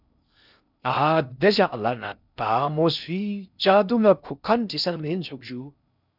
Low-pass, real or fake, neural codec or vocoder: 5.4 kHz; fake; codec, 16 kHz in and 24 kHz out, 0.6 kbps, FocalCodec, streaming, 2048 codes